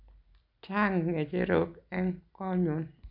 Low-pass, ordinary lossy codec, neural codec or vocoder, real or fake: 5.4 kHz; none; none; real